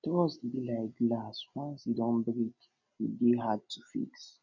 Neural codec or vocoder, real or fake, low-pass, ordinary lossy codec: none; real; 7.2 kHz; none